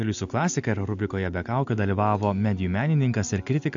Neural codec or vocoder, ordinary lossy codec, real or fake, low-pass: none; AAC, 64 kbps; real; 7.2 kHz